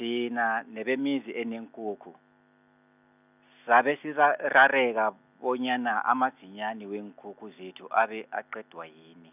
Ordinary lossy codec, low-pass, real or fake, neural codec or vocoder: none; 3.6 kHz; real; none